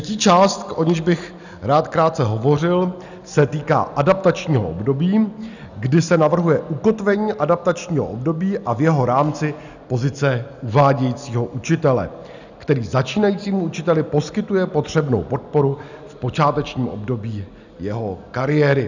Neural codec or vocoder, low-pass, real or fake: none; 7.2 kHz; real